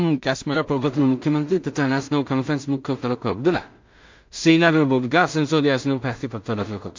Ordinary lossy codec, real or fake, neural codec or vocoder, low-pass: MP3, 48 kbps; fake; codec, 16 kHz in and 24 kHz out, 0.4 kbps, LongCat-Audio-Codec, two codebook decoder; 7.2 kHz